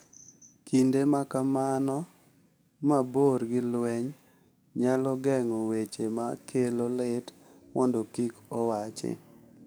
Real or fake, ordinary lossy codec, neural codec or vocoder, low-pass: fake; none; codec, 44.1 kHz, 7.8 kbps, DAC; none